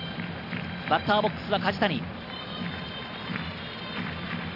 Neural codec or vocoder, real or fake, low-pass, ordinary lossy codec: vocoder, 44.1 kHz, 128 mel bands every 256 samples, BigVGAN v2; fake; 5.4 kHz; none